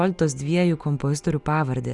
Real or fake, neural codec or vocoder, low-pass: real; none; 10.8 kHz